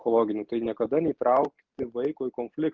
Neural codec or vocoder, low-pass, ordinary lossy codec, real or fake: none; 7.2 kHz; Opus, 24 kbps; real